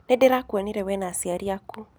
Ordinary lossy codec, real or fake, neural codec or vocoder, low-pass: none; real; none; none